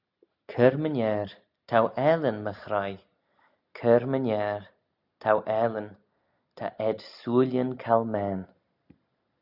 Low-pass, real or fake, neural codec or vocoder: 5.4 kHz; real; none